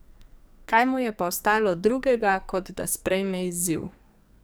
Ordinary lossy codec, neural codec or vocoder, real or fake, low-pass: none; codec, 44.1 kHz, 2.6 kbps, SNAC; fake; none